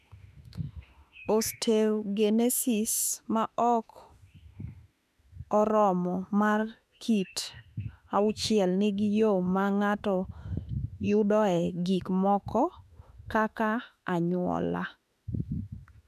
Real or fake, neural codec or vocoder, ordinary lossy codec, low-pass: fake; autoencoder, 48 kHz, 32 numbers a frame, DAC-VAE, trained on Japanese speech; none; 14.4 kHz